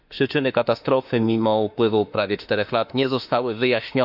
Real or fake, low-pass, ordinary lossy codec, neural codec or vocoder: fake; 5.4 kHz; none; autoencoder, 48 kHz, 32 numbers a frame, DAC-VAE, trained on Japanese speech